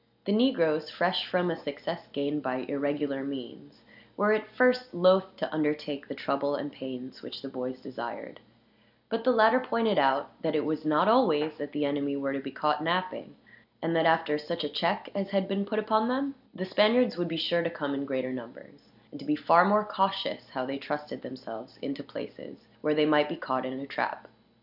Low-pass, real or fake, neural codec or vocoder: 5.4 kHz; real; none